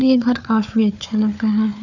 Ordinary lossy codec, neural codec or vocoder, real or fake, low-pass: none; codec, 16 kHz, 4 kbps, FunCodec, trained on Chinese and English, 50 frames a second; fake; 7.2 kHz